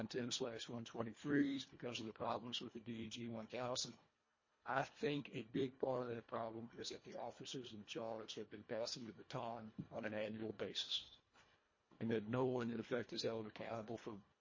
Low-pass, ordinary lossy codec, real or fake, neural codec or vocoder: 7.2 kHz; MP3, 32 kbps; fake; codec, 24 kHz, 1.5 kbps, HILCodec